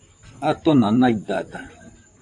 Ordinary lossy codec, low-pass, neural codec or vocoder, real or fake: AAC, 64 kbps; 10.8 kHz; vocoder, 44.1 kHz, 128 mel bands, Pupu-Vocoder; fake